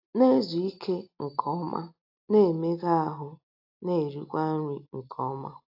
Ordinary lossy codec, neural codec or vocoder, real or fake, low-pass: AAC, 32 kbps; none; real; 5.4 kHz